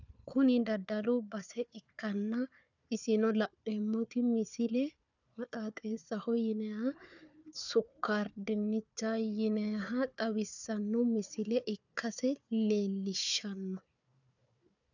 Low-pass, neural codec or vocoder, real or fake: 7.2 kHz; codec, 16 kHz, 4 kbps, FunCodec, trained on Chinese and English, 50 frames a second; fake